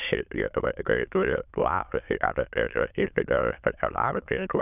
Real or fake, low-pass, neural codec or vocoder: fake; 3.6 kHz; autoencoder, 22.05 kHz, a latent of 192 numbers a frame, VITS, trained on many speakers